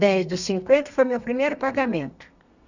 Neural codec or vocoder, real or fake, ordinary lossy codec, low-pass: codec, 32 kHz, 1.9 kbps, SNAC; fake; none; 7.2 kHz